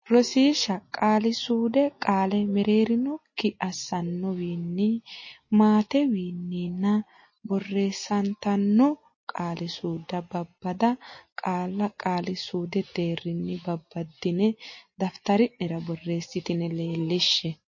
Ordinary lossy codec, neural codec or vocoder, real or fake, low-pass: MP3, 32 kbps; none; real; 7.2 kHz